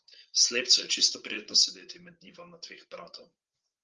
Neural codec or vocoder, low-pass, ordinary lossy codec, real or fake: codec, 16 kHz, 8 kbps, FreqCodec, larger model; 7.2 kHz; Opus, 24 kbps; fake